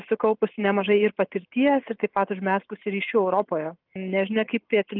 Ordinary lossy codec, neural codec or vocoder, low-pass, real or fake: Opus, 24 kbps; none; 5.4 kHz; real